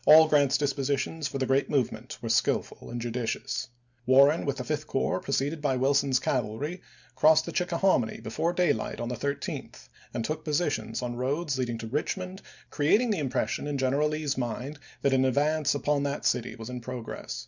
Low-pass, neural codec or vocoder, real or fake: 7.2 kHz; none; real